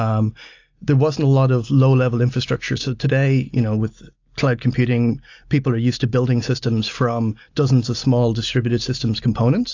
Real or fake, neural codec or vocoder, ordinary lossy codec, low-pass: real; none; AAC, 48 kbps; 7.2 kHz